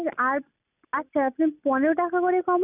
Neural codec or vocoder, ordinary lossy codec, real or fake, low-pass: none; none; real; 3.6 kHz